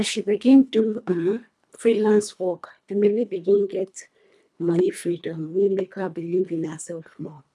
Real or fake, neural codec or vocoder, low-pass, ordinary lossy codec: fake; codec, 24 kHz, 1.5 kbps, HILCodec; none; none